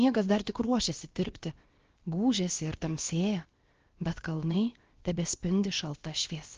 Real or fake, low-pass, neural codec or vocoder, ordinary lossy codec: fake; 7.2 kHz; codec, 16 kHz, about 1 kbps, DyCAST, with the encoder's durations; Opus, 16 kbps